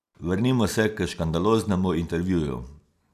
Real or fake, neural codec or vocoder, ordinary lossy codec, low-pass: real; none; none; 14.4 kHz